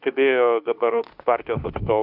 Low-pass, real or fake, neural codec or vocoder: 5.4 kHz; fake; autoencoder, 48 kHz, 32 numbers a frame, DAC-VAE, trained on Japanese speech